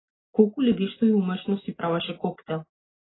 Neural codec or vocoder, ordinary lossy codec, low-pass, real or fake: none; AAC, 16 kbps; 7.2 kHz; real